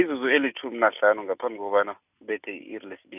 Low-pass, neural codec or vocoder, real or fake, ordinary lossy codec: 3.6 kHz; none; real; none